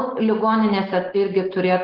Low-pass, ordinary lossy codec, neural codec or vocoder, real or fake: 5.4 kHz; Opus, 16 kbps; none; real